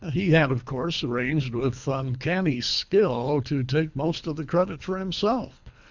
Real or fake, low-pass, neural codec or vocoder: fake; 7.2 kHz; codec, 24 kHz, 3 kbps, HILCodec